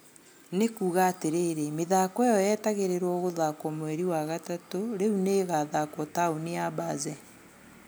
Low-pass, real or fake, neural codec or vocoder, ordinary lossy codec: none; real; none; none